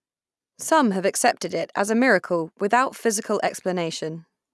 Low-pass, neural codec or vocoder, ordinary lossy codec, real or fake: none; none; none; real